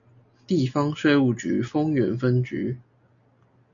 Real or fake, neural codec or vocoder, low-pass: real; none; 7.2 kHz